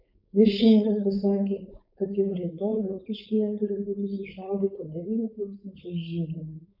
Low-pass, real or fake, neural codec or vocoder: 5.4 kHz; fake; codec, 16 kHz, 4.8 kbps, FACodec